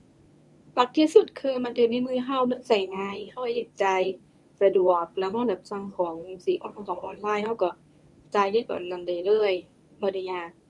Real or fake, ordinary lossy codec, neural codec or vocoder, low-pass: fake; none; codec, 24 kHz, 0.9 kbps, WavTokenizer, medium speech release version 1; none